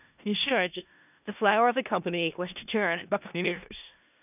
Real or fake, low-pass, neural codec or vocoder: fake; 3.6 kHz; codec, 16 kHz in and 24 kHz out, 0.4 kbps, LongCat-Audio-Codec, four codebook decoder